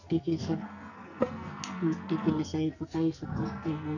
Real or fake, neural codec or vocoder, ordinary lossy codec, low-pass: fake; codec, 44.1 kHz, 2.6 kbps, SNAC; none; 7.2 kHz